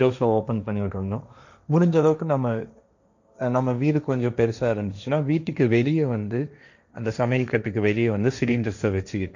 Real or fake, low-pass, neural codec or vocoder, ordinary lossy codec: fake; 7.2 kHz; codec, 16 kHz, 1.1 kbps, Voila-Tokenizer; none